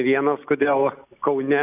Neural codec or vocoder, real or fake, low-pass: none; real; 3.6 kHz